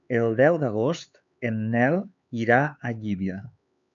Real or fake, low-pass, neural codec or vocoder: fake; 7.2 kHz; codec, 16 kHz, 4 kbps, X-Codec, HuBERT features, trained on LibriSpeech